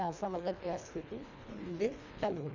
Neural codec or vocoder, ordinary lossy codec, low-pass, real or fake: codec, 24 kHz, 1.5 kbps, HILCodec; none; 7.2 kHz; fake